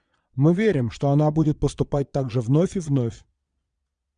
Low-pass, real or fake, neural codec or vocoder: 9.9 kHz; fake; vocoder, 22.05 kHz, 80 mel bands, Vocos